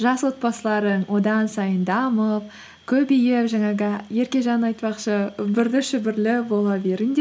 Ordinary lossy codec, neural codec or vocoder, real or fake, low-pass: none; none; real; none